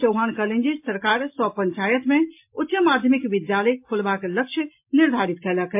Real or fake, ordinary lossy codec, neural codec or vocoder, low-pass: real; MP3, 32 kbps; none; 3.6 kHz